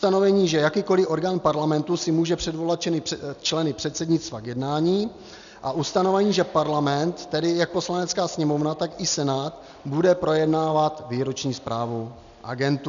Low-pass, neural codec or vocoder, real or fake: 7.2 kHz; none; real